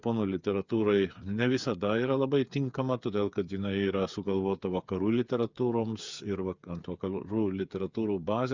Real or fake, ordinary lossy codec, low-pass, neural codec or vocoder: fake; Opus, 64 kbps; 7.2 kHz; codec, 16 kHz, 8 kbps, FreqCodec, smaller model